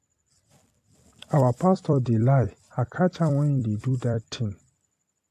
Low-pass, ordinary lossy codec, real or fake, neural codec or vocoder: 14.4 kHz; AAC, 64 kbps; fake; vocoder, 44.1 kHz, 128 mel bands every 256 samples, BigVGAN v2